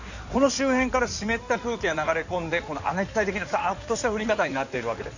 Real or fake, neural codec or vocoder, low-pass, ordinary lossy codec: fake; codec, 16 kHz in and 24 kHz out, 2.2 kbps, FireRedTTS-2 codec; 7.2 kHz; none